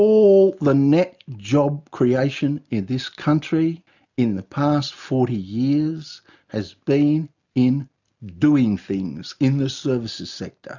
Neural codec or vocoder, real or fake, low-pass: none; real; 7.2 kHz